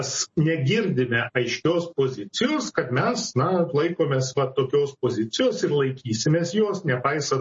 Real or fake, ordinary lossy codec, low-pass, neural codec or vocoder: real; MP3, 32 kbps; 9.9 kHz; none